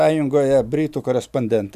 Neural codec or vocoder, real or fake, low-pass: none; real; 14.4 kHz